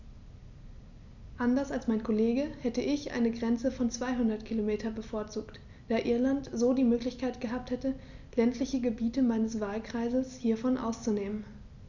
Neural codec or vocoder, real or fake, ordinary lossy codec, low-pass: none; real; none; 7.2 kHz